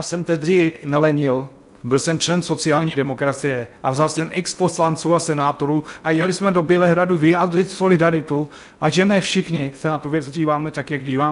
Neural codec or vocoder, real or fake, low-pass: codec, 16 kHz in and 24 kHz out, 0.6 kbps, FocalCodec, streaming, 4096 codes; fake; 10.8 kHz